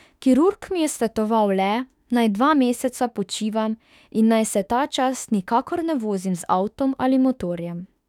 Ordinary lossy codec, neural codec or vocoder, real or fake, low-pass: none; autoencoder, 48 kHz, 32 numbers a frame, DAC-VAE, trained on Japanese speech; fake; 19.8 kHz